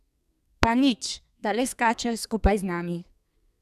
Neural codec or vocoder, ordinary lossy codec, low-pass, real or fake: codec, 32 kHz, 1.9 kbps, SNAC; none; 14.4 kHz; fake